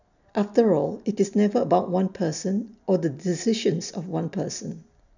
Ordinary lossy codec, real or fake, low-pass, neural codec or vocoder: none; real; 7.2 kHz; none